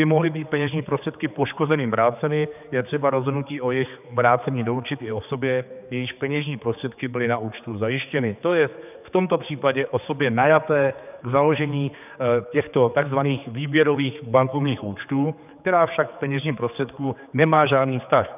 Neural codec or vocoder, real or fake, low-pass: codec, 16 kHz, 4 kbps, X-Codec, HuBERT features, trained on general audio; fake; 3.6 kHz